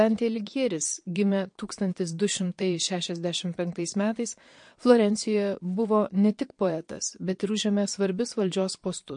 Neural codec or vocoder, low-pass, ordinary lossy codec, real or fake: vocoder, 22.05 kHz, 80 mel bands, Vocos; 9.9 kHz; MP3, 48 kbps; fake